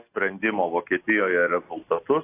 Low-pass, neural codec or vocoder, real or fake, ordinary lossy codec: 3.6 kHz; none; real; AAC, 24 kbps